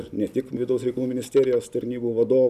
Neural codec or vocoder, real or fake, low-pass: none; real; 14.4 kHz